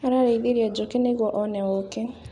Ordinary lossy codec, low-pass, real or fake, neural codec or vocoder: none; 10.8 kHz; real; none